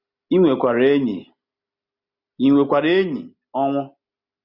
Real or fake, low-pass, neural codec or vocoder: real; 5.4 kHz; none